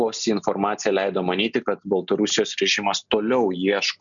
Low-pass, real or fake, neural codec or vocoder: 7.2 kHz; real; none